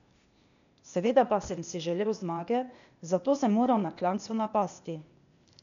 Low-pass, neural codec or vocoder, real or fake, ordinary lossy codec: 7.2 kHz; codec, 16 kHz, 0.8 kbps, ZipCodec; fake; MP3, 96 kbps